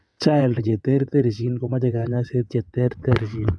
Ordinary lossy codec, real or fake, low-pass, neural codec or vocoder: none; fake; none; vocoder, 22.05 kHz, 80 mel bands, WaveNeXt